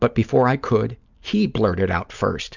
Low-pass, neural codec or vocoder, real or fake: 7.2 kHz; none; real